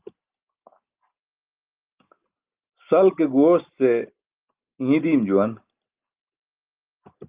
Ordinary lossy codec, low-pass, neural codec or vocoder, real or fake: Opus, 32 kbps; 3.6 kHz; none; real